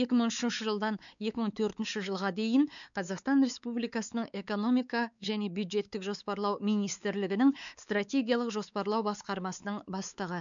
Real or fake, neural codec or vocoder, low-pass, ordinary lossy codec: fake; codec, 16 kHz, 4 kbps, X-Codec, WavLM features, trained on Multilingual LibriSpeech; 7.2 kHz; none